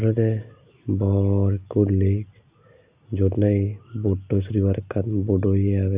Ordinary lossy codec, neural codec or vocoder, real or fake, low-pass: Opus, 64 kbps; none; real; 3.6 kHz